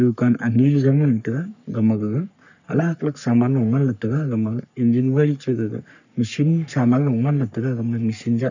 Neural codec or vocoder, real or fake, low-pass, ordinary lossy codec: codec, 44.1 kHz, 3.4 kbps, Pupu-Codec; fake; 7.2 kHz; none